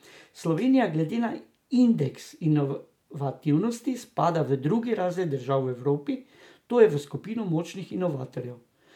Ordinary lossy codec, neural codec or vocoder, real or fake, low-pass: MP3, 96 kbps; none; real; 19.8 kHz